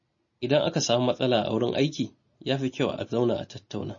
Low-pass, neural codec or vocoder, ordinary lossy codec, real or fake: 7.2 kHz; none; MP3, 32 kbps; real